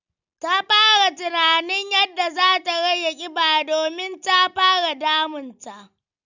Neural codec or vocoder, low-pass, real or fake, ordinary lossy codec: none; 7.2 kHz; real; none